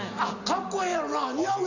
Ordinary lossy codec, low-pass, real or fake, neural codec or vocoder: none; 7.2 kHz; real; none